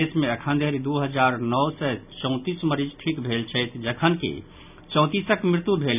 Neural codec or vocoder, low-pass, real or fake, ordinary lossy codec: none; 3.6 kHz; real; none